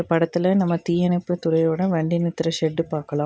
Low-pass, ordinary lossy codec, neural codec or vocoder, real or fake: none; none; none; real